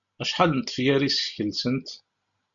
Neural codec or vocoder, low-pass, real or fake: none; 7.2 kHz; real